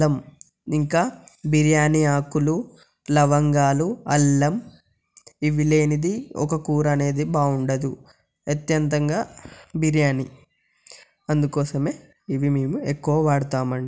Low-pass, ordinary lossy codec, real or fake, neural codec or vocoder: none; none; real; none